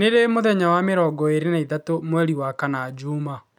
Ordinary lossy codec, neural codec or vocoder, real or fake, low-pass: none; none; real; 19.8 kHz